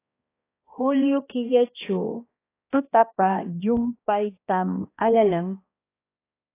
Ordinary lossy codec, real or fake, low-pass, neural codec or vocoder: AAC, 24 kbps; fake; 3.6 kHz; codec, 16 kHz, 1 kbps, X-Codec, HuBERT features, trained on balanced general audio